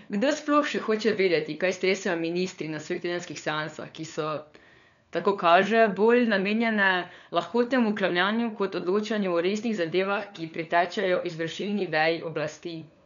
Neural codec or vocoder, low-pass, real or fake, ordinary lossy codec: codec, 16 kHz, 4 kbps, FunCodec, trained on LibriTTS, 50 frames a second; 7.2 kHz; fake; none